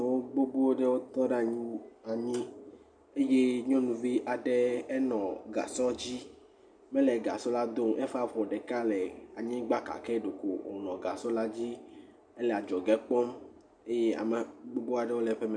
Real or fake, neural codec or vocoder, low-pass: real; none; 9.9 kHz